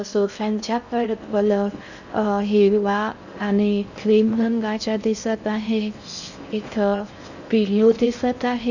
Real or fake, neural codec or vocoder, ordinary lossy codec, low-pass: fake; codec, 16 kHz in and 24 kHz out, 0.6 kbps, FocalCodec, streaming, 4096 codes; none; 7.2 kHz